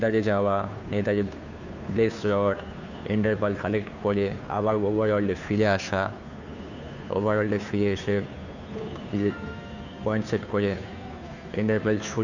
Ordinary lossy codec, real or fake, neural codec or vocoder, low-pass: none; fake; codec, 16 kHz, 2 kbps, FunCodec, trained on Chinese and English, 25 frames a second; 7.2 kHz